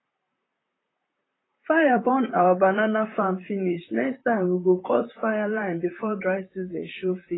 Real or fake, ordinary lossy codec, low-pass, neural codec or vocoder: fake; AAC, 16 kbps; 7.2 kHz; vocoder, 44.1 kHz, 128 mel bands, Pupu-Vocoder